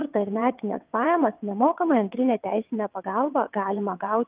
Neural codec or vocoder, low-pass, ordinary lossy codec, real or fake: vocoder, 22.05 kHz, 80 mel bands, WaveNeXt; 3.6 kHz; Opus, 32 kbps; fake